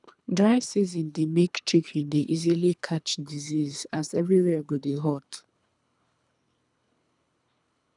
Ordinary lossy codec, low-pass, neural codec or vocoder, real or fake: none; 10.8 kHz; codec, 24 kHz, 3 kbps, HILCodec; fake